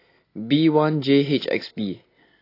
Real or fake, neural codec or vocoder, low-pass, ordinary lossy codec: real; none; 5.4 kHz; AAC, 24 kbps